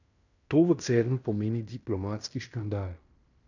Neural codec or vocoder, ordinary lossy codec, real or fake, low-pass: codec, 16 kHz in and 24 kHz out, 0.9 kbps, LongCat-Audio-Codec, fine tuned four codebook decoder; none; fake; 7.2 kHz